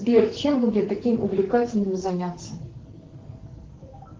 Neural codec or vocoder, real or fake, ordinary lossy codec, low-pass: codec, 44.1 kHz, 2.6 kbps, SNAC; fake; Opus, 16 kbps; 7.2 kHz